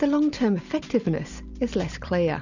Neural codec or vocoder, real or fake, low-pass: none; real; 7.2 kHz